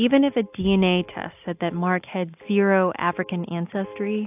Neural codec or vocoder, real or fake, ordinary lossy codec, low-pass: none; real; AAC, 32 kbps; 3.6 kHz